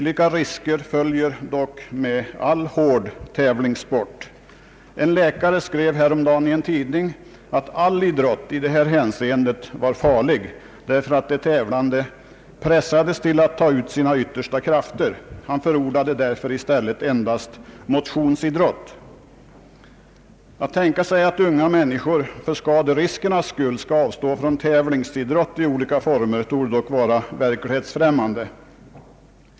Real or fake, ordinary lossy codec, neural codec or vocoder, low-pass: real; none; none; none